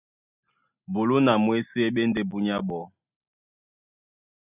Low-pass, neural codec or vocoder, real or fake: 3.6 kHz; none; real